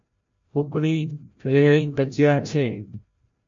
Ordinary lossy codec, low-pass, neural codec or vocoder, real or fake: MP3, 48 kbps; 7.2 kHz; codec, 16 kHz, 0.5 kbps, FreqCodec, larger model; fake